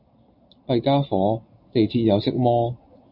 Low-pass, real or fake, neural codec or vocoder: 5.4 kHz; real; none